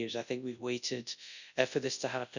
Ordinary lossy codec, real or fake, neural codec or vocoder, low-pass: none; fake; codec, 24 kHz, 0.9 kbps, WavTokenizer, large speech release; 7.2 kHz